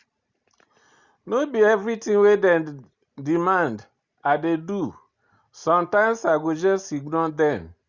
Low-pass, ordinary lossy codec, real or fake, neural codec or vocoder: 7.2 kHz; Opus, 64 kbps; real; none